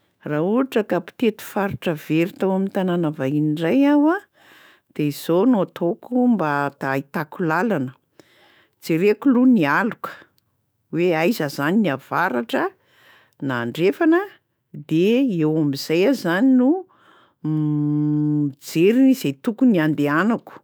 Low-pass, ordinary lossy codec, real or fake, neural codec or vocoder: none; none; fake; autoencoder, 48 kHz, 128 numbers a frame, DAC-VAE, trained on Japanese speech